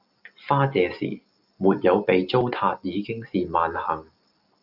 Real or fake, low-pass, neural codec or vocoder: real; 5.4 kHz; none